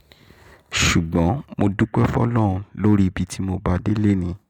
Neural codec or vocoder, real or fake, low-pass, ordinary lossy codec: none; real; 19.8 kHz; MP3, 96 kbps